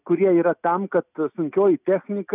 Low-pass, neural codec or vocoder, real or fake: 3.6 kHz; none; real